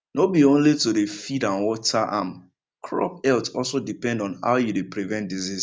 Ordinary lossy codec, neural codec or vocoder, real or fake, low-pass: none; none; real; none